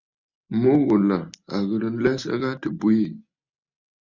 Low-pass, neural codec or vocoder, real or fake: 7.2 kHz; none; real